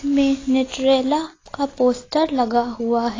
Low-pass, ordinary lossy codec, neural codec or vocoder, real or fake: 7.2 kHz; AAC, 32 kbps; none; real